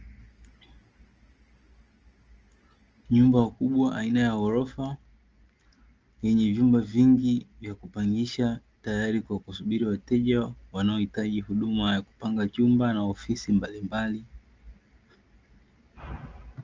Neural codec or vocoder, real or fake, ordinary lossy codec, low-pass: none; real; Opus, 24 kbps; 7.2 kHz